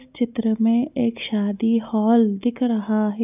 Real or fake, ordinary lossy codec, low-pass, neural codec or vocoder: real; none; 3.6 kHz; none